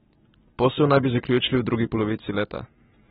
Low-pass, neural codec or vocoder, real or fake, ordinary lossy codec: 7.2 kHz; none; real; AAC, 16 kbps